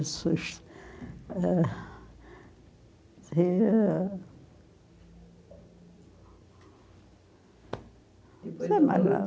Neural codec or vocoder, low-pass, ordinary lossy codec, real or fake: none; none; none; real